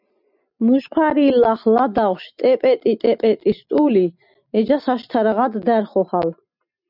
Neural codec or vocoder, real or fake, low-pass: none; real; 5.4 kHz